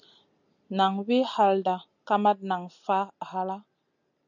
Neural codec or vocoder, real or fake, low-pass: none; real; 7.2 kHz